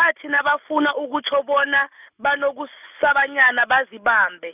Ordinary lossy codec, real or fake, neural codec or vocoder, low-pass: none; real; none; 3.6 kHz